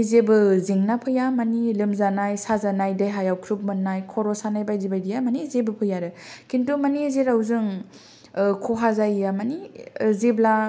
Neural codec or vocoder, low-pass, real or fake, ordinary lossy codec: none; none; real; none